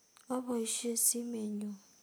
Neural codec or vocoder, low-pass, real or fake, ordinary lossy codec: none; none; real; none